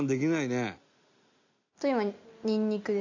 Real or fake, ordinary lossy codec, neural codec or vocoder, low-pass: real; AAC, 48 kbps; none; 7.2 kHz